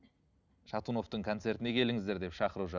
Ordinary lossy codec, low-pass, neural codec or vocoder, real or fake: none; 7.2 kHz; none; real